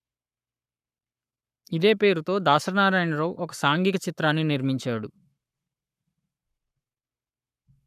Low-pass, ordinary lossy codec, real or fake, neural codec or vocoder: 14.4 kHz; none; fake; codec, 44.1 kHz, 7.8 kbps, Pupu-Codec